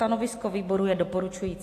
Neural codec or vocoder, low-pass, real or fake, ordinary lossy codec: none; 14.4 kHz; real; AAC, 64 kbps